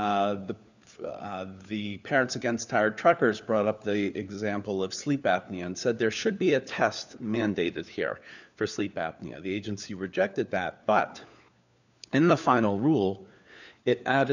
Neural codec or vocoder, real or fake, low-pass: codec, 16 kHz, 4 kbps, FunCodec, trained on LibriTTS, 50 frames a second; fake; 7.2 kHz